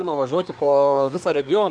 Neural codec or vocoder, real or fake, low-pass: codec, 24 kHz, 1 kbps, SNAC; fake; 9.9 kHz